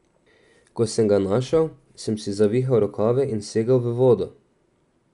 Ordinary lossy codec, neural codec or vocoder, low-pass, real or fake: none; none; 10.8 kHz; real